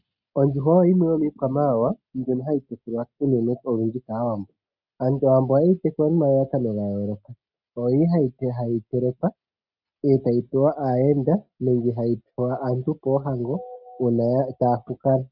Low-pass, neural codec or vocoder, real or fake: 5.4 kHz; none; real